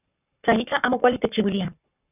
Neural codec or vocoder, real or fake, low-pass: codec, 44.1 kHz, 7.8 kbps, Pupu-Codec; fake; 3.6 kHz